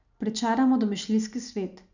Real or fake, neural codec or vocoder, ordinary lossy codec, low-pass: real; none; none; 7.2 kHz